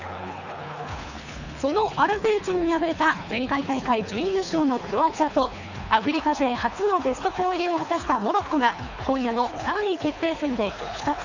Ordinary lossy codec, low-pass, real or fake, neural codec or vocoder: none; 7.2 kHz; fake; codec, 24 kHz, 3 kbps, HILCodec